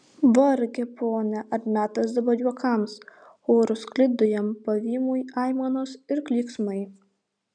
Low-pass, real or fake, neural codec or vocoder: 9.9 kHz; real; none